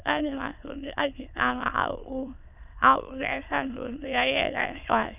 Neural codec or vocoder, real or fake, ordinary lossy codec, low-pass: autoencoder, 22.05 kHz, a latent of 192 numbers a frame, VITS, trained on many speakers; fake; none; 3.6 kHz